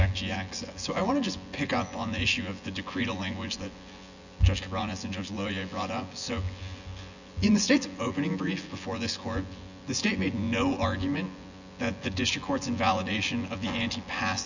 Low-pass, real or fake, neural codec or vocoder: 7.2 kHz; fake; vocoder, 24 kHz, 100 mel bands, Vocos